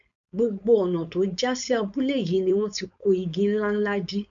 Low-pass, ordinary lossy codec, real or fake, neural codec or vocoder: 7.2 kHz; none; fake; codec, 16 kHz, 4.8 kbps, FACodec